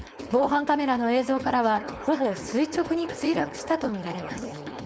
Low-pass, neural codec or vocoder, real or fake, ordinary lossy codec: none; codec, 16 kHz, 4.8 kbps, FACodec; fake; none